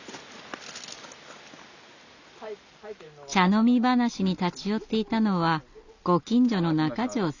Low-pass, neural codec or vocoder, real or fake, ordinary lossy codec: 7.2 kHz; none; real; none